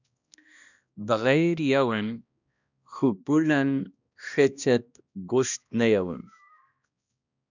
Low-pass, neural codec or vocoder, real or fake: 7.2 kHz; codec, 16 kHz, 1 kbps, X-Codec, HuBERT features, trained on balanced general audio; fake